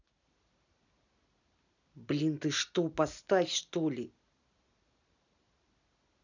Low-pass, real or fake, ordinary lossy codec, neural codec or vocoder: 7.2 kHz; real; none; none